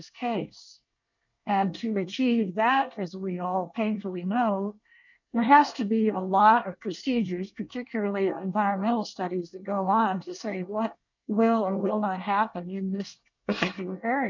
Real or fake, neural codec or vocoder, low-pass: fake; codec, 24 kHz, 1 kbps, SNAC; 7.2 kHz